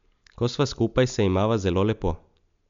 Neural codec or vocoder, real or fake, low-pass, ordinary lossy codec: none; real; 7.2 kHz; MP3, 64 kbps